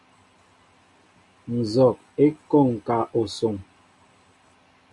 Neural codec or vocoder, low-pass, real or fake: none; 10.8 kHz; real